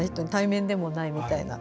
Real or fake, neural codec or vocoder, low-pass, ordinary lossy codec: real; none; none; none